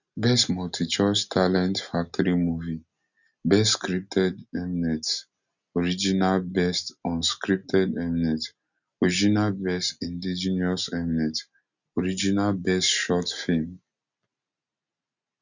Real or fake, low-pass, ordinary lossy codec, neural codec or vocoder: real; 7.2 kHz; none; none